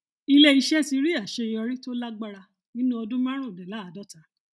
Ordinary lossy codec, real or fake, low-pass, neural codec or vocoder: none; real; none; none